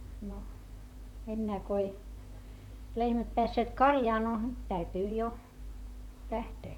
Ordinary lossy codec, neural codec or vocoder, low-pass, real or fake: none; vocoder, 44.1 kHz, 128 mel bands, Pupu-Vocoder; 19.8 kHz; fake